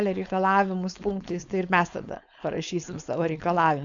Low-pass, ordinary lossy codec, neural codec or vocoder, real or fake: 7.2 kHz; AAC, 64 kbps; codec, 16 kHz, 4.8 kbps, FACodec; fake